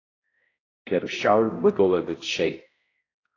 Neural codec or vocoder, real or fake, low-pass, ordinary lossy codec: codec, 16 kHz, 0.5 kbps, X-Codec, HuBERT features, trained on balanced general audio; fake; 7.2 kHz; AAC, 32 kbps